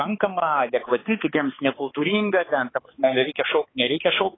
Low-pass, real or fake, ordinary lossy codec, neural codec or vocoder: 7.2 kHz; fake; AAC, 16 kbps; codec, 16 kHz, 4 kbps, X-Codec, HuBERT features, trained on balanced general audio